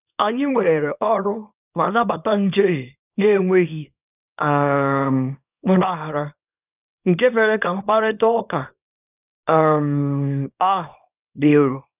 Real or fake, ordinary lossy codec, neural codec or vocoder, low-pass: fake; none; codec, 24 kHz, 0.9 kbps, WavTokenizer, small release; 3.6 kHz